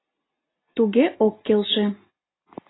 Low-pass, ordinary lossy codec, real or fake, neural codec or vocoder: 7.2 kHz; AAC, 16 kbps; real; none